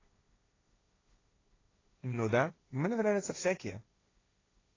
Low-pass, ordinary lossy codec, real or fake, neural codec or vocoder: 7.2 kHz; AAC, 32 kbps; fake; codec, 16 kHz, 1.1 kbps, Voila-Tokenizer